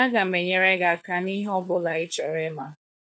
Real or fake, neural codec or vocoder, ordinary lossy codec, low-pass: fake; codec, 16 kHz, 4 kbps, FunCodec, trained on LibriTTS, 50 frames a second; none; none